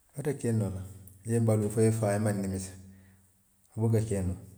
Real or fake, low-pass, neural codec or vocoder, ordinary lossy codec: real; none; none; none